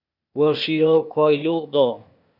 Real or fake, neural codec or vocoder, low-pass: fake; codec, 16 kHz, 0.8 kbps, ZipCodec; 5.4 kHz